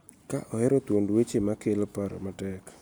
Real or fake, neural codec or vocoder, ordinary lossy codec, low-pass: real; none; none; none